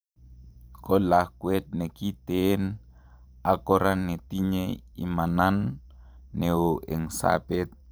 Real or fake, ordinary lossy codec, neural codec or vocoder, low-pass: fake; none; vocoder, 44.1 kHz, 128 mel bands every 512 samples, BigVGAN v2; none